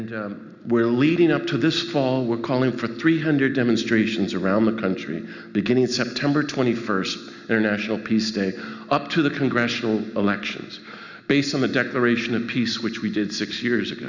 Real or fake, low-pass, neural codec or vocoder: real; 7.2 kHz; none